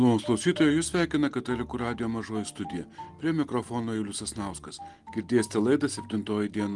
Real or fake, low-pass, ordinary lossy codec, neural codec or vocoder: real; 10.8 kHz; Opus, 24 kbps; none